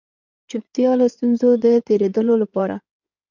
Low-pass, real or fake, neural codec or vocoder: 7.2 kHz; fake; codec, 16 kHz in and 24 kHz out, 2.2 kbps, FireRedTTS-2 codec